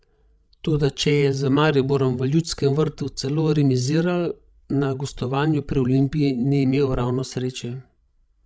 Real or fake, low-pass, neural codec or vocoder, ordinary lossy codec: fake; none; codec, 16 kHz, 16 kbps, FreqCodec, larger model; none